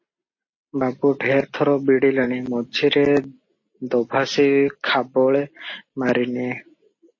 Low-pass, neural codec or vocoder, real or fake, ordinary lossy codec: 7.2 kHz; none; real; MP3, 32 kbps